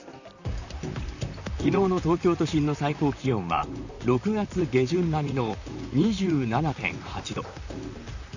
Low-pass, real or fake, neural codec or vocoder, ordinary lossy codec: 7.2 kHz; fake; vocoder, 44.1 kHz, 128 mel bands, Pupu-Vocoder; none